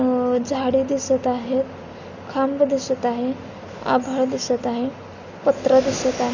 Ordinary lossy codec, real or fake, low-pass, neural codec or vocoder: none; real; 7.2 kHz; none